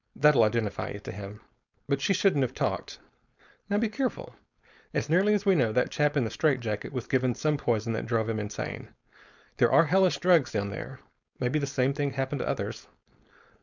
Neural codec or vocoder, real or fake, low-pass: codec, 16 kHz, 4.8 kbps, FACodec; fake; 7.2 kHz